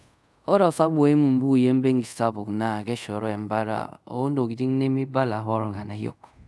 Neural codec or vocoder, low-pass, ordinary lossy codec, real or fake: codec, 24 kHz, 0.5 kbps, DualCodec; none; none; fake